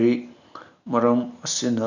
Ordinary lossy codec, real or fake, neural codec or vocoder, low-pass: none; real; none; 7.2 kHz